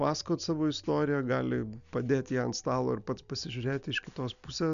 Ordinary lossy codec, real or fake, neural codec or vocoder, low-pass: MP3, 96 kbps; real; none; 7.2 kHz